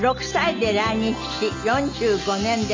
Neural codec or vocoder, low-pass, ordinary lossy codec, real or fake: none; 7.2 kHz; MP3, 64 kbps; real